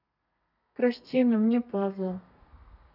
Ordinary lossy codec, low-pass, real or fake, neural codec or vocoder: none; 5.4 kHz; fake; codec, 32 kHz, 1.9 kbps, SNAC